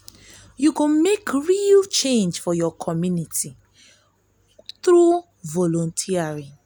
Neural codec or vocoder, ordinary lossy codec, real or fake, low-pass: none; none; real; none